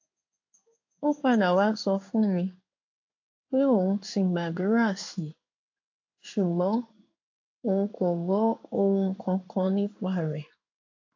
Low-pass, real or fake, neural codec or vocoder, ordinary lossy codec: 7.2 kHz; fake; codec, 16 kHz in and 24 kHz out, 1 kbps, XY-Tokenizer; AAC, 48 kbps